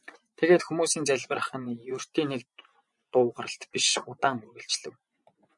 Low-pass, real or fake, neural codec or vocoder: 10.8 kHz; fake; vocoder, 24 kHz, 100 mel bands, Vocos